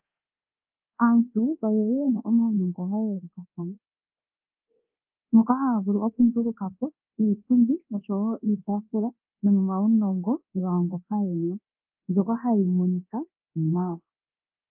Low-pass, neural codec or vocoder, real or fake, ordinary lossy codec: 3.6 kHz; codec, 24 kHz, 0.9 kbps, DualCodec; fake; Opus, 24 kbps